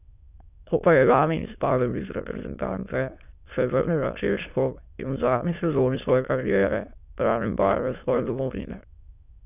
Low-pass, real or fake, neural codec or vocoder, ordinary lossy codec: 3.6 kHz; fake; autoencoder, 22.05 kHz, a latent of 192 numbers a frame, VITS, trained on many speakers; none